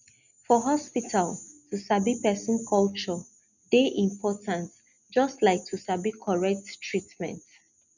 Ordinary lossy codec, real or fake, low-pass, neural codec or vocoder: none; real; 7.2 kHz; none